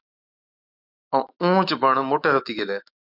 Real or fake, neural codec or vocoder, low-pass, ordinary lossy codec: fake; autoencoder, 48 kHz, 128 numbers a frame, DAC-VAE, trained on Japanese speech; 5.4 kHz; AAC, 48 kbps